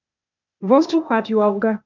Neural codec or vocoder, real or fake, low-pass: codec, 16 kHz, 0.8 kbps, ZipCodec; fake; 7.2 kHz